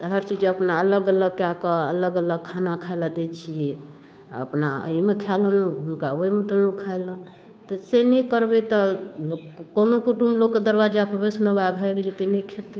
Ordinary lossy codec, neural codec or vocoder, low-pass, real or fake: none; codec, 16 kHz, 2 kbps, FunCodec, trained on Chinese and English, 25 frames a second; none; fake